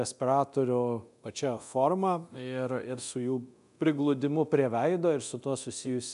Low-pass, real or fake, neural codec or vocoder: 10.8 kHz; fake; codec, 24 kHz, 0.9 kbps, DualCodec